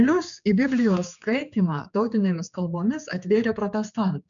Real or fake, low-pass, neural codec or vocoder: fake; 7.2 kHz; codec, 16 kHz, 4 kbps, X-Codec, HuBERT features, trained on general audio